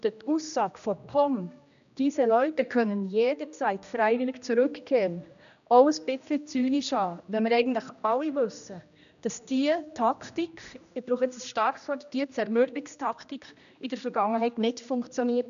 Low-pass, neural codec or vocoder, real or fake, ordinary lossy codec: 7.2 kHz; codec, 16 kHz, 1 kbps, X-Codec, HuBERT features, trained on general audio; fake; none